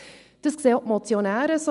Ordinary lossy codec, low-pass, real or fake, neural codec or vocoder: MP3, 96 kbps; 10.8 kHz; real; none